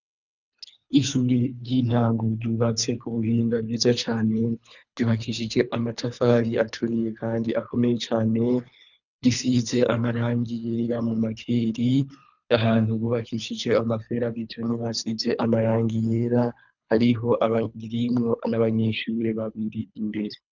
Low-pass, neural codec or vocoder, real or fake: 7.2 kHz; codec, 24 kHz, 3 kbps, HILCodec; fake